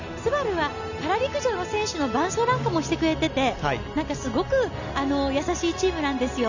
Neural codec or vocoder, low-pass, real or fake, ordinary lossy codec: none; 7.2 kHz; real; none